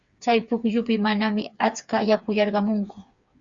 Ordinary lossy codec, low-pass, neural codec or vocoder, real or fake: Opus, 64 kbps; 7.2 kHz; codec, 16 kHz, 4 kbps, FreqCodec, smaller model; fake